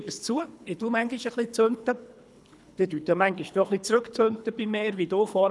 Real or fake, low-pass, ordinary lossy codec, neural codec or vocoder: fake; none; none; codec, 24 kHz, 3 kbps, HILCodec